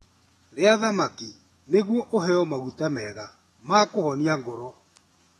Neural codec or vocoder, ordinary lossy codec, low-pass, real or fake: autoencoder, 48 kHz, 128 numbers a frame, DAC-VAE, trained on Japanese speech; AAC, 32 kbps; 19.8 kHz; fake